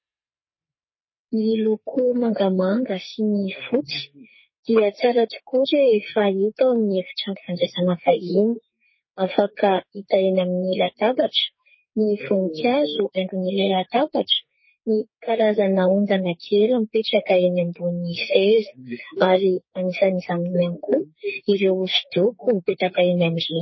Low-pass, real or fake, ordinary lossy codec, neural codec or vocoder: 7.2 kHz; fake; MP3, 24 kbps; codec, 44.1 kHz, 2.6 kbps, SNAC